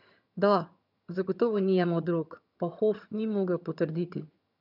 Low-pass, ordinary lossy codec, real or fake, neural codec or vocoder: 5.4 kHz; none; fake; vocoder, 22.05 kHz, 80 mel bands, HiFi-GAN